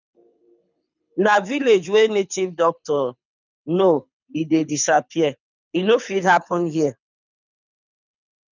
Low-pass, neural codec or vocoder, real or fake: 7.2 kHz; codec, 24 kHz, 6 kbps, HILCodec; fake